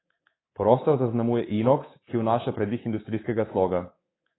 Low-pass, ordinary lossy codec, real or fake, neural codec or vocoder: 7.2 kHz; AAC, 16 kbps; fake; codec, 24 kHz, 3.1 kbps, DualCodec